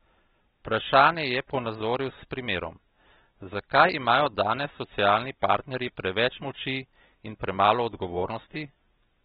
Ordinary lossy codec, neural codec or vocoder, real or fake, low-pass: AAC, 16 kbps; none; real; 19.8 kHz